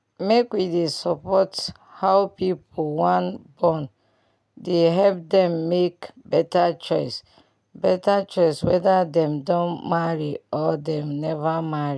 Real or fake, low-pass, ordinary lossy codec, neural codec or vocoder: real; none; none; none